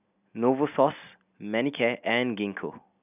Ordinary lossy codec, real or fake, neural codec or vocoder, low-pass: none; real; none; 3.6 kHz